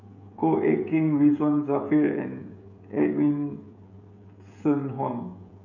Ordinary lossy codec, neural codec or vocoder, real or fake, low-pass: none; codec, 16 kHz, 16 kbps, FreqCodec, smaller model; fake; 7.2 kHz